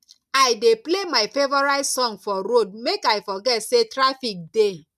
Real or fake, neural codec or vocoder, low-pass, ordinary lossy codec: real; none; 14.4 kHz; none